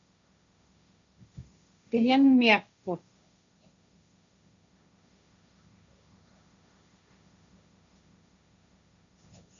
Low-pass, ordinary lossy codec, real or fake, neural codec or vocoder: 7.2 kHz; AAC, 64 kbps; fake; codec, 16 kHz, 1.1 kbps, Voila-Tokenizer